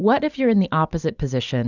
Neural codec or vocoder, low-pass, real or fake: none; 7.2 kHz; real